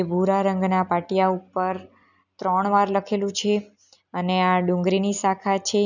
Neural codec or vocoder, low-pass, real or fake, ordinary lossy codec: none; 7.2 kHz; real; none